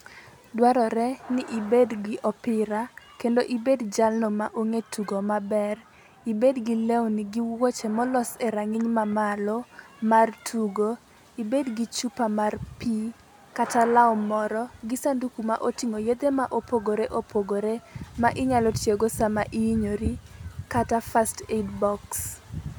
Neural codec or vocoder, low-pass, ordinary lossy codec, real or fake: none; none; none; real